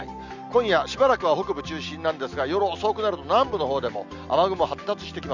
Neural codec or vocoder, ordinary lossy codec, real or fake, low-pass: none; none; real; 7.2 kHz